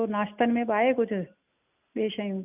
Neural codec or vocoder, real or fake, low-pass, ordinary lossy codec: none; real; 3.6 kHz; none